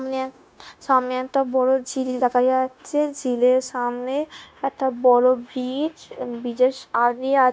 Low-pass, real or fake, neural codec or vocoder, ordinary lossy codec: none; fake; codec, 16 kHz, 0.9 kbps, LongCat-Audio-Codec; none